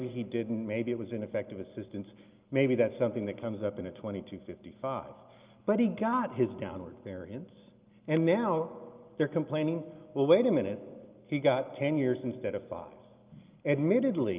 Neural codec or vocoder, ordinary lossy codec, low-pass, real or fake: none; Opus, 24 kbps; 3.6 kHz; real